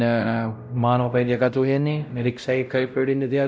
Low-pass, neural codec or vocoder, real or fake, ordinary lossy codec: none; codec, 16 kHz, 0.5 kbps, X-Codec, WavLM features, trained on Multilingual LibriSpeech; fake; none